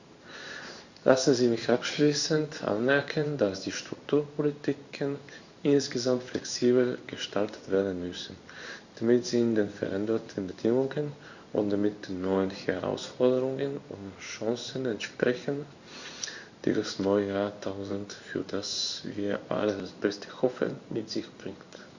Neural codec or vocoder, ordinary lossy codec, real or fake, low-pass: codec, 16 kHz in and 24 kHz out, 1 kbps, XY-Tokenizer; Opus, 64 kbps; fake; 7.2 kHz